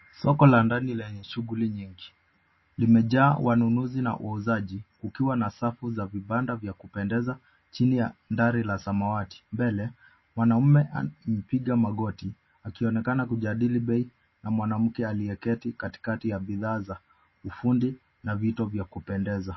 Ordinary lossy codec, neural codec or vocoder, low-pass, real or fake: MP3, 24 kbps; none; 7.2 kHz; real